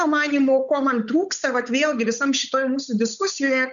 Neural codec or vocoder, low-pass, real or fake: codec, 16 kHz, 8 kbps, FunCodec, trained on Chinese and English, 25 frames a second; 7.2 kHz; fake